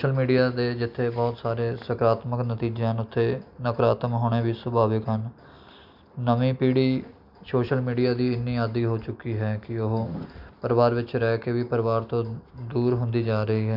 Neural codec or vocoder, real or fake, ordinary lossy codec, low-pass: none; real; none; 5.4 kHz